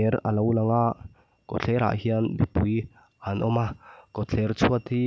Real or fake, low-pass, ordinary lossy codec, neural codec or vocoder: real; none; none; none